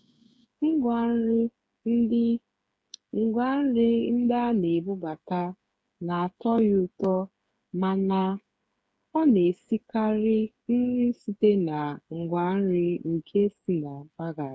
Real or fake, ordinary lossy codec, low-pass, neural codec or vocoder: fake; none; none; codec, 16 kHz, 8 kbps, FreqCodec, smaller model